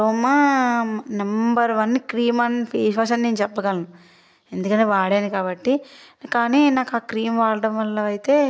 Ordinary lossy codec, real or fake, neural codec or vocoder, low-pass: none; real; none; none